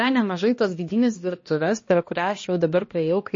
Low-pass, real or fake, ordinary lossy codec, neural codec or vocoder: 7.2 kHz; fake; MP3, 32 kbps; codec, 16 kHz, 1 kbps, X-Codec, HuBERT features, trained on balanced general audio